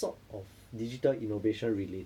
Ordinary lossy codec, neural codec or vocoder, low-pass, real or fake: none; none; 19.8 kHz; real